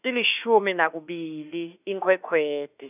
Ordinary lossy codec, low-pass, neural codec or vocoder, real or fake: none; 3.6 kHz; autoencoder, 48 kHz, 32 numbers a frame, DAC-VAE, trained on Japanese speech; fake